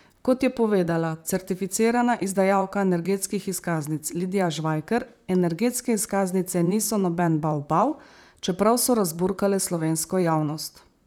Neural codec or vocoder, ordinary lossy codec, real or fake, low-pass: vocoder, 44.1 kHz, 128 mel bands, Pupu-Vocoder; none; fake; none